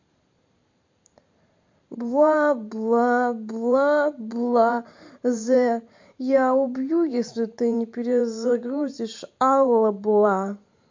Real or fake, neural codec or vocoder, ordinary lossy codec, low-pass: fake; vocoder, 44.1 kHz, 128 mel bands every 512 samples, BigVGAN v2; MP3, 48 kbps; 7.2 kHz